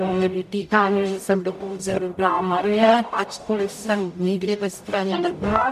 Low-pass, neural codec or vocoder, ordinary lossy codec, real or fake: 14.4 kHz; codec, 44.1 kHz, 0.9 kbps, DAC; AAC, 96 kbps; fake